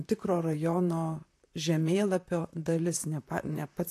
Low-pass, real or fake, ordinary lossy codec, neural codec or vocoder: 14.4 kHz; fake; AAC, 64 kbps; vocoder, 44.1 kHz, 128 mel bands, Pupu-Vocoder